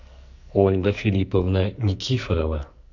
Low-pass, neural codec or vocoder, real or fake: 7.2 kHz; codec, 44.1 kHz, 2.6 kbps, SNAC; fake